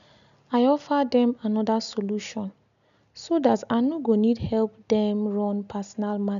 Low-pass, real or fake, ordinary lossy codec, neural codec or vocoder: 7.2 kHz; real; none; none